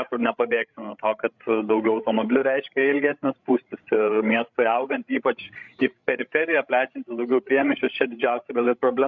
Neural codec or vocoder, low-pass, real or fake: codec, 16 kHz, 16 kbps, FreqCodec, larger model; 7.2 kHz; fake